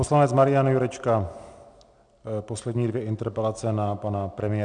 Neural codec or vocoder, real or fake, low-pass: none; real; 9.9 kHz